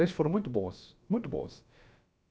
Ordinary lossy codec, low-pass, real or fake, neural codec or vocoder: none; none; fake; codec, 16 kHz, about 1 kbps, DyCAST, with the encoder's durations